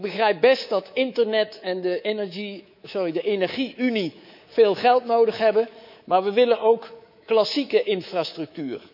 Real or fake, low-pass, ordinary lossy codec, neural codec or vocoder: fake; 5.4 kHz; none; codec, 24 kHz, 3.1 kbps, DualCodec